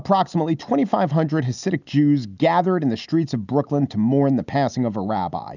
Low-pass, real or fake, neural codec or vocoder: 7.2 kHz; real; none